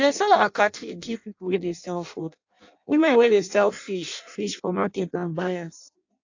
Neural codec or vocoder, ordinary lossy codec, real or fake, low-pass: codec, 16 kHz in and 24 kHz out, 0.6 kbps, FireRedTTS-2 codec; AAC, 48 kbps; fake; 7.2 kHz